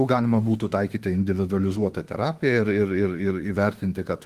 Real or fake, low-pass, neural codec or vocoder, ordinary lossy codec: fake; 14.4 kHz; autoencoder, 48 kHz, 32 numbers a frame, DAC-VAE, trained on Japanese speech; Opus, 24 kbps